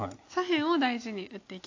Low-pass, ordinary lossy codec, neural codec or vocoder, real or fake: 7.2 kHz; AAC, 48 kbps; none; real